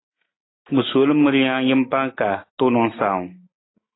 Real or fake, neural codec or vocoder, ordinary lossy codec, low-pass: real; none; AAC, 16 kbps; 7.2 kHz